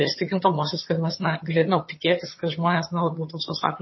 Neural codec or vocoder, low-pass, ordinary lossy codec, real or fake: vocoder, 22.05 kHz, 80 mel bands, HiFi-GAN; 7.2 kHz; MP3, 24 kbps; fake